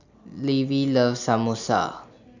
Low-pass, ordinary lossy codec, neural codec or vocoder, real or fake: 7.2 kHz; none; none; real